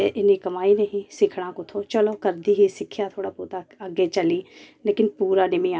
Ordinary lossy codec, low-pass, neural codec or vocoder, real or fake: none; none; none; real